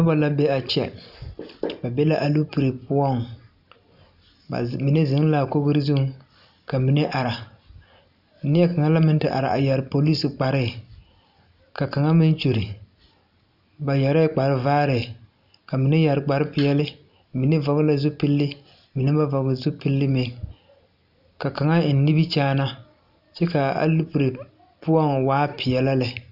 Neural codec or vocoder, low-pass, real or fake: none; 5.4 kHz; real